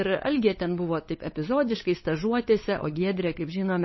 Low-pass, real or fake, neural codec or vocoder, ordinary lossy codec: 7.2 kHz; fake; codec, 16 kHz, 8 kbps, FunCodec, trained on LibriTTS, 25 frames a second; MP3, 24 kbps